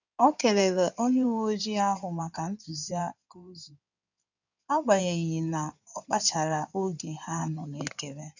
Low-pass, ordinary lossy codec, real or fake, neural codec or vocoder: 7.2 kHz; none; fake; codec, 16 kHz in and 24 kHz out, 2.2 kbps, FireRedTTS-2 codec